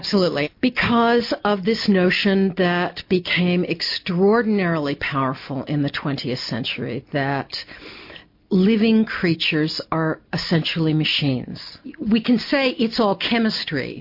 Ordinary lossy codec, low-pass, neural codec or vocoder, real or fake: MP3, 32 kbps; 5.4 kHz; none; real